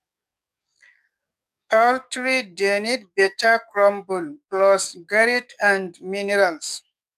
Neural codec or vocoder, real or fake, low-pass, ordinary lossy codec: codec, 44.1 kHz, 7.8 kbps, DAC; fake; 14.4 kHz; none